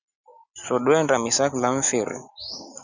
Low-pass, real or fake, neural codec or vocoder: 7.2 kHz; real; none